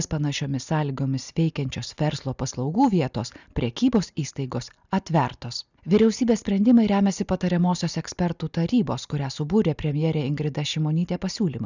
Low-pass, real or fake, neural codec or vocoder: 7.2 kHz; real; none